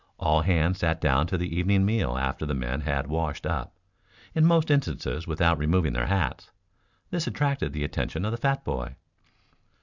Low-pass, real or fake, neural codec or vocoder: 7.2 kHz; real; none